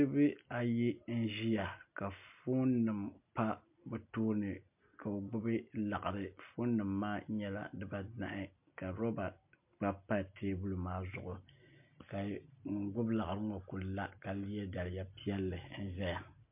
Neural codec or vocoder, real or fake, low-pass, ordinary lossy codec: none; real; 3.6 kHz; MP3, 32 kbps